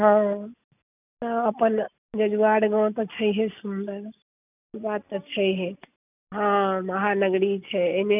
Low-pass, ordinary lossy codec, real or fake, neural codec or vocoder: 3.6 kHz; none; real; none